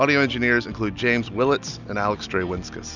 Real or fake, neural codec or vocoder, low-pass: real; none; 7.2 kHz